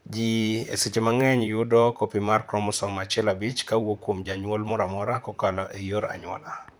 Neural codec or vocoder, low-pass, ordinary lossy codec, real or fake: vocoder, 44.1 kHz, 128 mel bands, Pupu-Vocoder; none; none; fake